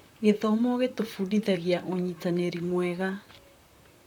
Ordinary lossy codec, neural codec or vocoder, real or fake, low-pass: none; vocoder, 44.1 kHz, 128 mel bands, Pupu-Vocoder; fake; 19.8 kHz